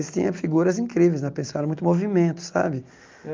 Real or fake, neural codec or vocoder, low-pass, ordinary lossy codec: real; none; 7.2 kHz; Opus, 24 kbps